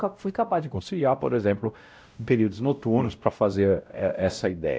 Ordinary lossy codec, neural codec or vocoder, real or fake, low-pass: none; codec, 16 kHz, 0.5 kbps, X-Codec, WavLM features, trained on Multilingual LibriSpeech; fake; none